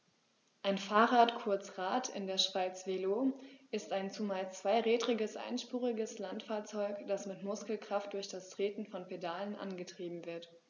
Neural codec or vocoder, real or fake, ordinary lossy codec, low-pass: none; real; none; 7.2 kHz